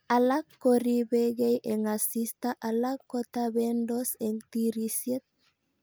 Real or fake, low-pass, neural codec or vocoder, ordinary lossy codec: real; none; none; none